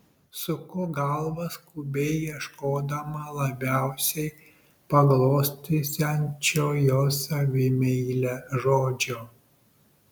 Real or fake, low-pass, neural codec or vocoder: real; 19.8 kHz; none